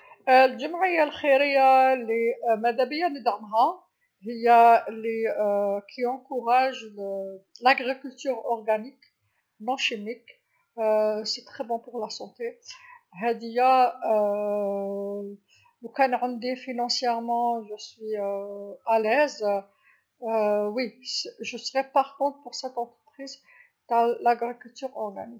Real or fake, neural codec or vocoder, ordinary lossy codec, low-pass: real; none; none; none